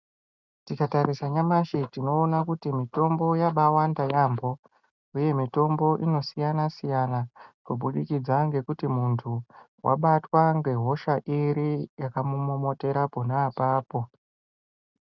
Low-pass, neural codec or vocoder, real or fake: 7.2 kHz; none; real